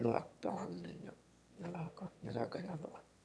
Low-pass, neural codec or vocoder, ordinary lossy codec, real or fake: none; autoencoder, 22.05 kHz, a latent of 192 numbers a frame, VITS, trained on one speaker; none; fake